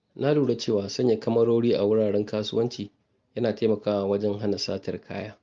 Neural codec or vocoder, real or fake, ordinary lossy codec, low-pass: none; real; Opus, 32 kbps; 7.2 kHz